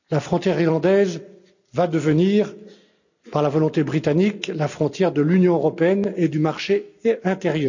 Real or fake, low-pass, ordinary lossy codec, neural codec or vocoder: real; 7.2 kHz; none; none